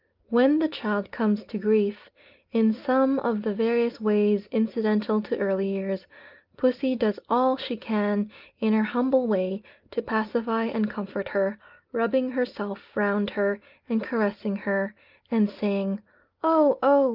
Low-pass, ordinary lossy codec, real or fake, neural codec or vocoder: 5.4 kHz; Opus, 24 kbps; real; none